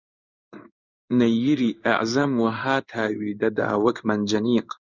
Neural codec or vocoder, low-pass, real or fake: codec, 16 kHz in and 24 kHz out, 1 kbps, XY-Tokenizer; 7.2 kHz; fake